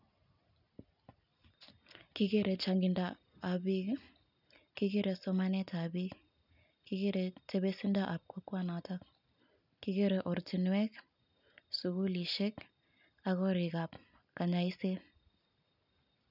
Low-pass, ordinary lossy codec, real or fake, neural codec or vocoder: 5.4 kHz; none; real; none